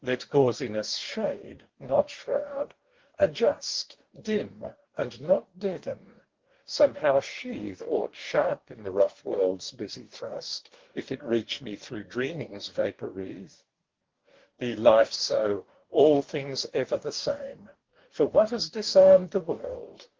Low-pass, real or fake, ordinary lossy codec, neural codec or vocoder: 7.2 kHz; fake; Opus, 16 kbps; codec, 44.1 kHz, 2.6 kbps, DAC